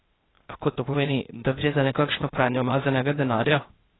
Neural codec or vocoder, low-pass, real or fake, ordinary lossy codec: codec, 16 kHz, 0.8 kbps, ZipCodec; 7.2 kHz; fake; AAC, 16 kbps